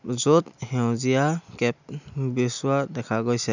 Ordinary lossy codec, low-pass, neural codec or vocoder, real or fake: none; 7.2 kHz; none; real